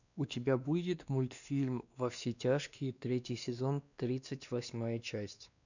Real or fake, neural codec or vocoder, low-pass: fake; codec, 16 kHz, 2 kbps, X-Codec, WavLM features, trained on Multilingual LibriSpeech; 7.2 kHz